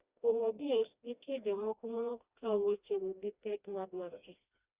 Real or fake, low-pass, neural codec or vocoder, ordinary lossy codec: fake; 3.6 kHz; codec, 16 kHz, 1 kbps, FreqCodec, smaller model; Opus, 64 kbps